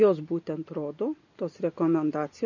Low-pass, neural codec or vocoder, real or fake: 7.2 kHz; none; real